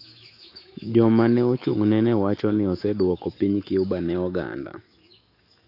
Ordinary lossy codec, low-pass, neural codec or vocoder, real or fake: AAC, 32 kbps; 5.4 kHz; autoencoder, 48 kHz, 128 numbers a frame, DAC-VAE, trained on Japanese speech; fake